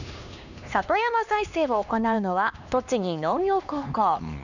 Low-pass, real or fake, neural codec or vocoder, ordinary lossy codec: 7.2 kHz; fake; codec, 16 kHz, 2 kbps, X-Codec, HuBERT features, trained on LibriSpeech; none